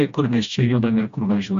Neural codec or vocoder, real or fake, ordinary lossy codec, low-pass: codec, 16 kHz, 1 kbps, FreqCodec, smaller model; fake; MP3, 48 kbps; 7.2 kHz